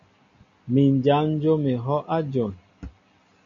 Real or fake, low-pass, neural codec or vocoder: real; 7.2 kHz; none